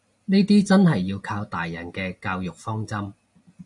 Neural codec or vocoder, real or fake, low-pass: none; real; 10.8 kHz